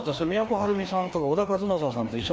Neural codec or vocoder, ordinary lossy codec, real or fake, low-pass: codec, 16 kHz, 2 kbps, FreqCodec, larger model; none; fake; none